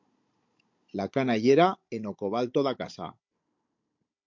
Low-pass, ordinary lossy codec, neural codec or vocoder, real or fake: 7.2 kHz; MP3, 48 kbps; codec, 16 kHz, 16 kbps, FunCodec, trained on Chinese and English, 50 frames a second; fake